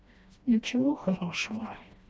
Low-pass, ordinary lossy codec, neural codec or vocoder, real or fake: none; none; codec, 16 kHz, 1 kbps, FreqCodec, smaller model; fake